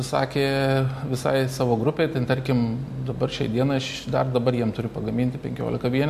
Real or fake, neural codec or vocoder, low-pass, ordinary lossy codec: real; none; 14.4 kHz; MP3, 64 kbps